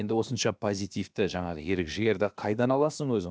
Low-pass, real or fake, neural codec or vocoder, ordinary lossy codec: none; fake; codec, 16 kHz, about 1 kbps, DyCAST, with the encoder's durations; none